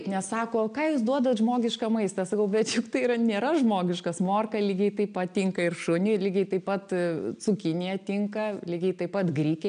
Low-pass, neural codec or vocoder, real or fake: 9.9 kHz; none; real